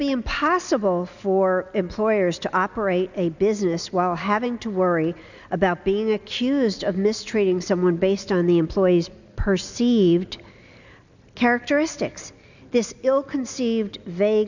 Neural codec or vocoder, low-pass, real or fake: none; 7.2 kHz; real